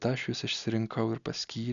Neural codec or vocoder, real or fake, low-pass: none; real; 7.2 kHz